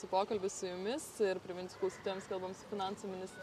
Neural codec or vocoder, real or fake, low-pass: none; real; 14.4 kHz